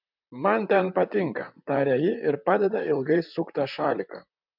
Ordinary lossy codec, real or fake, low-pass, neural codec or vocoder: MP3, 48 kbps; fake; 5.4 kHz; vocoder, 44.1 kHz, 128 mel bands, Pupu-Vocoder